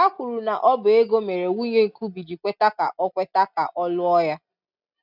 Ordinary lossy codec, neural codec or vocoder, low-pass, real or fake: none; none; 5.4 kHz; real